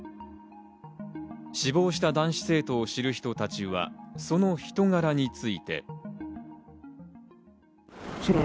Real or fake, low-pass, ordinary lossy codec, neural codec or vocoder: real; none; none; none